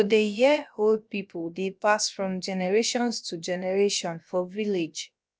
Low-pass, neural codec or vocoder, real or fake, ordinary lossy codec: none; codec, 16 kHz, about 1 kbps, DyCAST, with the encoder's durations; fake; none